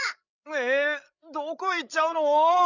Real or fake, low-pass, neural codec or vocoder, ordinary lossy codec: fake; 7.2 kHz; codec, 16 kHz, 8 kbps, FreqCodec, larger model; none